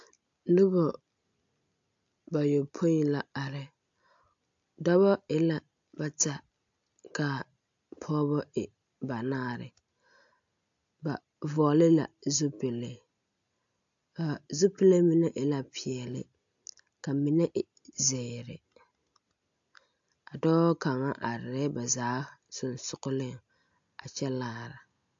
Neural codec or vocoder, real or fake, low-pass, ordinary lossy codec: none; real; 7.2 kHz; AAC, 64 kbps